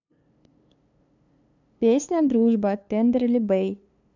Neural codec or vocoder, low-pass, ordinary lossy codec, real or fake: codec, 16 kHz, 2 kbps, FunCodec, trained on LibriTTS, 25 frames a second; 7.2 kHz; none; fake